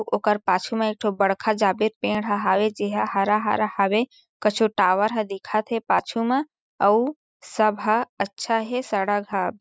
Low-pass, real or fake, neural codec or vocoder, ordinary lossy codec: none; real; none; none